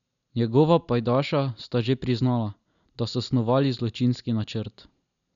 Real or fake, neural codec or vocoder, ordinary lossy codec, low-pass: real; none; none; 7.2 kHz